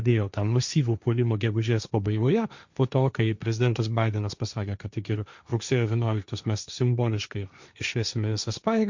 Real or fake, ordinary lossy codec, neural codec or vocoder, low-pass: fake; Opus, 64 kbps; codec, 16 kHz, 1.1 kbps, Voila-Tokenizer; 7.2 kHz